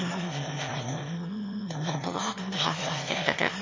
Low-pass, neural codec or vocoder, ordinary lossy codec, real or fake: 7.2 kHz; autoencoder, 22.05 kHz, a latent of 192 numbers a frame, VITS, trained on one speaker; MP3, 32 kbps; fake